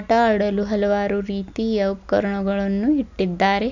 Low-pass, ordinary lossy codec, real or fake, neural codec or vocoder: 7.2 kHz; none; real; none